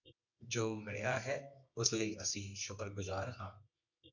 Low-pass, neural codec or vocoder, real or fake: 7.2 kHz; codec, 24 kHz, 0.9 kbps, WavTokenizer, medium music audio release; fake